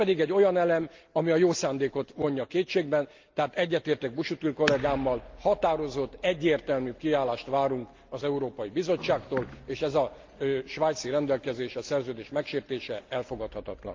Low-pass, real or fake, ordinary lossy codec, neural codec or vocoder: 7.2 kHz; real; Opus, 32 kbps; none